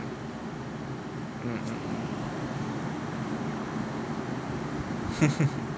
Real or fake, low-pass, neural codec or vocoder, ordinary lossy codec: real; none; none; none